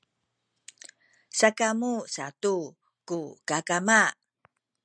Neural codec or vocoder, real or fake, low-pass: none; real; 9.9 kHz